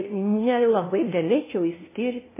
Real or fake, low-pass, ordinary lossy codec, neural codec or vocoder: fake; 3.6 kHz; MP3, 16 kbps; codec, 16 kHz, 0.5 kbps, FunCodec, trained on LibriTTS, 25 frames a second